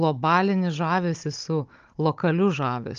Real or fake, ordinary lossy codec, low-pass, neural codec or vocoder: fake; Opus, 32 kbps; 7.2 kHz; codec, 16 kHz, 16 kbps, FunCodec, trained on Chinese and English, 50 frames a second